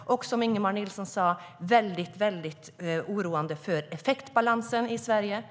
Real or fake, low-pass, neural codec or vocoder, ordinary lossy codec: real; none; none; none